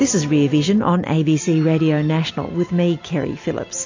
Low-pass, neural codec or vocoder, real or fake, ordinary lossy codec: 7.2 kHz; none; real; AAC, 48 kbps